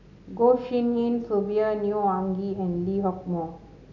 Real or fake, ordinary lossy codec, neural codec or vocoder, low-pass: real; none; none; 7.2 kHz